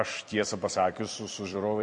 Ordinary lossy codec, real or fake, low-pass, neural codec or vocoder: MP3, 48 kbps; fake; 10.8 kHz; vocoder, 44.1 kHz, 128 mel bands every 256 samples, BigVGAN v2